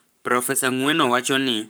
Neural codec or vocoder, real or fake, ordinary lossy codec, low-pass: codec, 44.1 kHz, 7.8 kbps, Pupu-Codec; fake; none; none